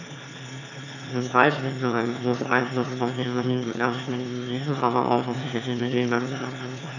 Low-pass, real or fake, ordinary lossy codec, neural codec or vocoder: 7.2 kHz; fake; none; autoencoder, 22.05 kHz, a latent of 192 numbers a frame, VITS, trained on one speaker